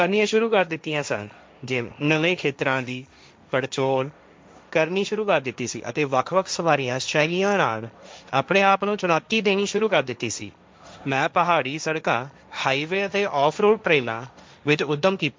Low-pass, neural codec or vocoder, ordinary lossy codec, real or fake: none; codec, 16 kHz, 1.1 kbps, Voila-Tokenizer; none; fake